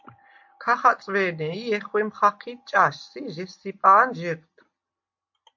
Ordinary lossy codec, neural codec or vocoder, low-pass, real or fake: MP3, 48 kbps; none; 7.2 kHz; real